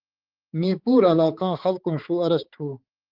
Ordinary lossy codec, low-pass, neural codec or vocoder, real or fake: Opus, 16 kbps; 5.4 kHz; codec, 16 kHz, 4 kbps, X-Codec, HuBERT features, trained on balanced general audio; fake